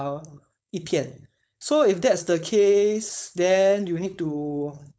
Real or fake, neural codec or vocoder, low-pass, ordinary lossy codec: fake; codec, 16 kHz, 4.8 kbps, FACodec; none; none